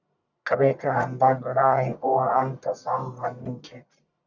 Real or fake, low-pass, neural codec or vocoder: fake; 7.2 kHz; codec, 44.1 kHz, 1.7 kbps, Pupu-Codec